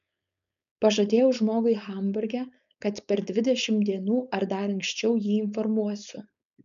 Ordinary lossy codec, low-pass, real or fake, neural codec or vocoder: MP3, 96 kbps; 7.2 kHz; fake; codec, 16 kHz, 4.8 kbps, FACodec